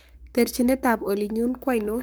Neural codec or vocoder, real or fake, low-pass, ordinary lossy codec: codec, 44.1 kHz, 7.8 kbps, Pupu-Codec; fake; none; none